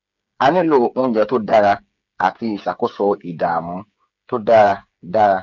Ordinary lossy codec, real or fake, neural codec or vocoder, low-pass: none; fake; codec, 16 kHz, 8 kbps, FreqCodec, smaller model; 7.2 kHz